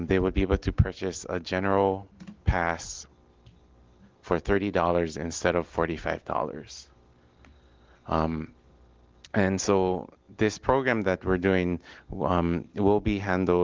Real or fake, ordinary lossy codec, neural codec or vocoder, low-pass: real; Opus, 16 kbps; none; 7.2 kHz